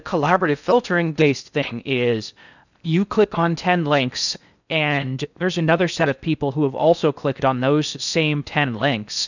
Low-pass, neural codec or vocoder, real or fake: 7.2 kHz; codec, 16 kHz in and 24 kHz out, 0.6 kbps, FocalCodec, streaming, 4096 codes; fake